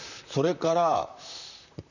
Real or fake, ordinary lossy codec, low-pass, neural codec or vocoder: fake; none; 7.2 kHz; vocoder, 44.1 kHz, 128 mel bands, Pupu-Vocoder